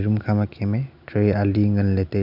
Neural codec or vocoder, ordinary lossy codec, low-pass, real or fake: none; none; 5.4 kHz; real